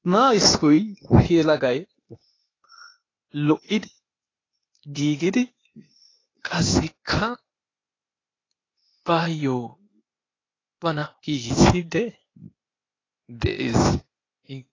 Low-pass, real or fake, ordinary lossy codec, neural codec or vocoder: 7.2 kHz; fake; AAC, 32 kbps; codec, 16 kHz, 0.8 kbps, ZipCodec